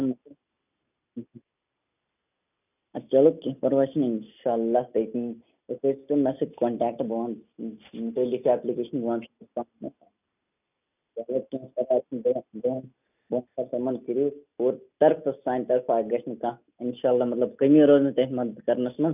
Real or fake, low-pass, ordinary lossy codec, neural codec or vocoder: real; 3.6 kHz; none; none